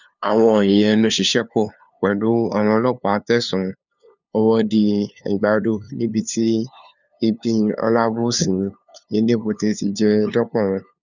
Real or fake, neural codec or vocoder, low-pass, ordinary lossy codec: fake; codec, 16 kHz, 2 kbps, FunCodec, trained on LibriTTS, 25 frames a second; 7.2 kHz; none